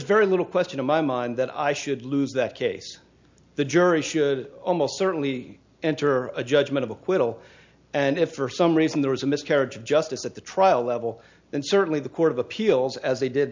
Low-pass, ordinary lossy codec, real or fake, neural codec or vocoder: 7.2 kHz; MP3, 64 kbps; real; none